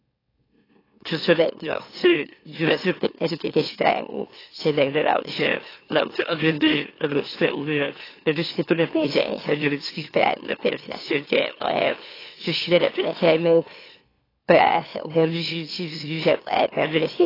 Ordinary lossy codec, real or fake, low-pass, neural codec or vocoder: AAC, 24 kbps; fake; 5.4 kHz; autoencoder, 44.1 kHz, a latent of 192 numbers a frame, MeloTTS